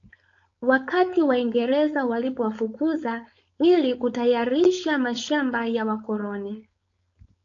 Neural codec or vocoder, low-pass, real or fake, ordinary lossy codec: codec, 16 kHz, 4.8 kbps, FACodec; 7.2 kHz; fake; AAC, 48 kbps